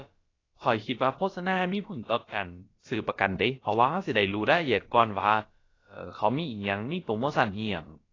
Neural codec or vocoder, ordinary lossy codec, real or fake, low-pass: codec, 16 kHz, about 1 kbps, DyCAST, with the encoder's durations; AAC, 32 kbps; fake; 7.2 kHz